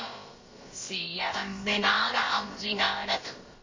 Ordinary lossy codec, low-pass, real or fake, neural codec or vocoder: MP3, 32 kbps; 7.2 kHz; fake; codec, 16 kHz, about 1 kbps, DyCAST, with the encoder's durations